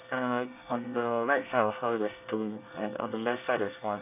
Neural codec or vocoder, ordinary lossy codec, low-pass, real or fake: codec, 24 kHz, 1 kbps, SNAC; none; 3.6 kHz; fake